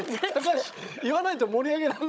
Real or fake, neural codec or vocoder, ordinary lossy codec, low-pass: fake; codec, 16 kHz, 16 kbps, FunCodec, trained on Chinese and English, 50 frames a second; none; none